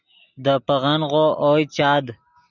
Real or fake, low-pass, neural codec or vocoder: real; 7.2 kHz; none